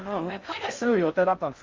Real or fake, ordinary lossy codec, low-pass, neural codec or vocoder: fake; Opus, 32 kbps; 7.2 kHz; codec, 16 kHz in and 24 kHz out, 0.6 kbps, FocalCodec, streaming, 4096 codes